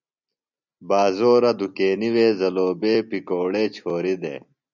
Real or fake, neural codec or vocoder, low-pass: real; none; 7.2 kHz